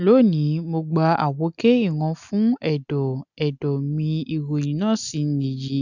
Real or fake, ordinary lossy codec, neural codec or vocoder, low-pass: real; none; none; 7.2 kHz